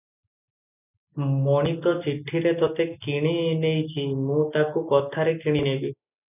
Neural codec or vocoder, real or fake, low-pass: none; real; 3.6 kHz